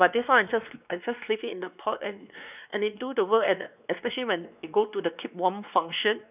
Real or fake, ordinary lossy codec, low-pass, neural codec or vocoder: fake; none; 3.6 kHz; codec, 16 kHz, 2 kbps, X-Codec, WavLM features, trained on Multilingual LibriSpeech